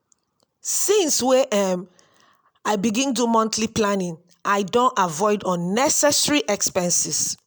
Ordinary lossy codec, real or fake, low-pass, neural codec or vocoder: none; real; none; none